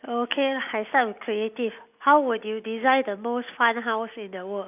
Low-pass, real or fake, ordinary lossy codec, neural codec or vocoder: 3.6 kHz; real; none; none